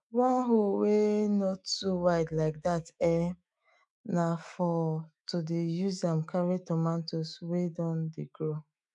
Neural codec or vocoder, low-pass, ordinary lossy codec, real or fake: autoencoder, 48 kHz, 128 numbers a frame, DAC-VAE, trained on Japanese speech; 10.8 kHz; none; fake